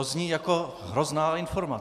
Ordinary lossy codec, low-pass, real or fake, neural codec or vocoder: MP3, 96 kbps; 14.4 kHz; real; none